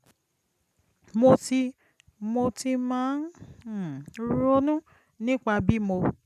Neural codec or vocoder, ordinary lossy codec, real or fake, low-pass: none; none; real; 14.4 kHz